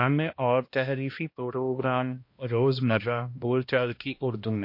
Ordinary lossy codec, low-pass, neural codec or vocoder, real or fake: MP3, 32 kbps; 5.4 kHz; codec, 16 kHz, 1 kbps, X-Codec, HuBERT features, trained on balanced general audio; fake